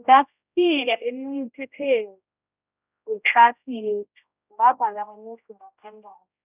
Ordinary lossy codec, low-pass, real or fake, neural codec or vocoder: none; 3.6 kHz; fake; codec, 16 kHz, 0.5 kbps, X-Codec, HuBERT features, trained on general audio